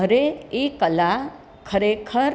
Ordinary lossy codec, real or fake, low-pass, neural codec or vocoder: none; real; none; none